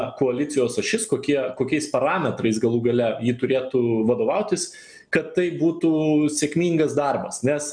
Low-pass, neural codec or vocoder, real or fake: 9.9 kHz; none; real